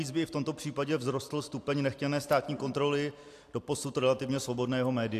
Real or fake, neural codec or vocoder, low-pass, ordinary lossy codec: real; none; 14.4 kHz; AAC, 64 kbps